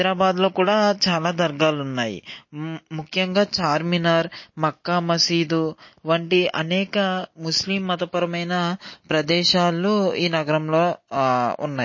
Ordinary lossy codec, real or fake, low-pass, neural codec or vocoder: MP3, 32 kbps; real; 7.2 kHz; none